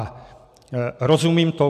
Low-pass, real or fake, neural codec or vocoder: 14.4 kHz; real; none